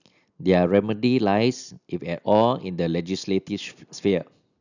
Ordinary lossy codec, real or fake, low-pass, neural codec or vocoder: none; real; 7.2 kHz; none